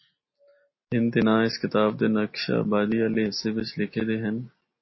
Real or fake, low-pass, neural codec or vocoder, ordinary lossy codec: real; 7.2 kHz; none; MP3, 24 kbps